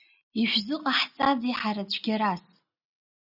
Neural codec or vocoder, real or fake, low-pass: none; real; 5.4 kHz